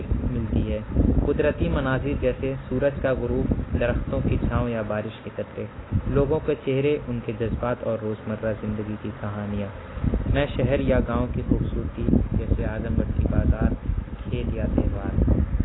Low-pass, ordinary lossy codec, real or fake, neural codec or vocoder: 7.2 kHz; AAC, 16 kbps; real; none